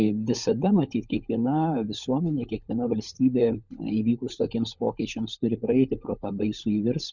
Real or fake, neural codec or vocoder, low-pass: fake; codec, 16 kHz, 16 kbps, FunCodec, trained on LibriTTS, 50 frames a second; 7.2 kHz